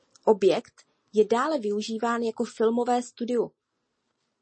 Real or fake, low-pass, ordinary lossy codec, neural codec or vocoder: real; 9.9 kHz; MP3, 32 kbps; none